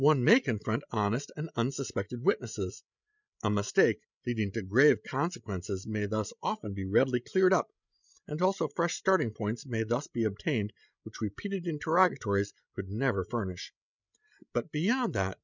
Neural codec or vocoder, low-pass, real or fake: codec, 16 kHz, 16 kbps, FreqCodec, larger model; 7.2 kHz; fake